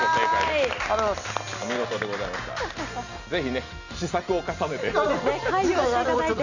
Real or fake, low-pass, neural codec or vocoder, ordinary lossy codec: real; 7.2 kHz; none; none